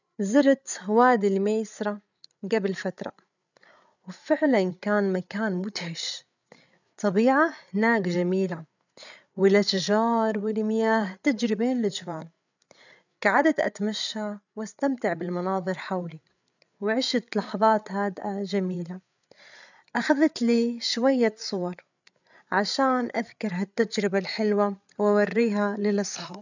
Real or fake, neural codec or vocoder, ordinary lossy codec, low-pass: fake; codec, 16 kHz, 8 kbps, FreqCodec, larger model; none; 7.2 kHz